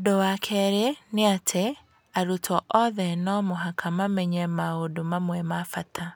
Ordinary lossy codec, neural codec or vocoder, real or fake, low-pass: none; none; real; none